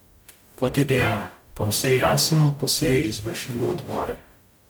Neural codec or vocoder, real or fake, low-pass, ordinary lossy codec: codec, 44.1 kHz, 0.9 kbps, DAC; fake; none; none